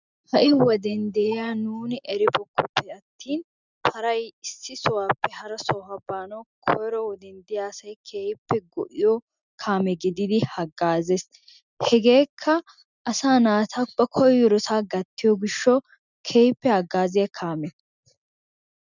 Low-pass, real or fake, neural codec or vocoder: 7.2 kHz; real; none